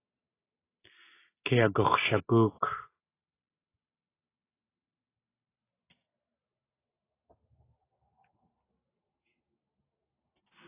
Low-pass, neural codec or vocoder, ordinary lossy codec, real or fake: 3.6 kHz; none; AAC, 16 kbps; real